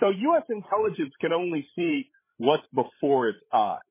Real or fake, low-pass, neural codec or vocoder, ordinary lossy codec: fake; 3.6 kHz; codec, 16 kHz, 8 kbps, FreqCodec, larger model; MP3, 16 kbps